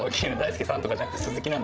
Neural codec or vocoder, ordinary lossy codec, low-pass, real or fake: codec, 16 kHz, 16 kbps, FreqCodec, larger model; none; none; fake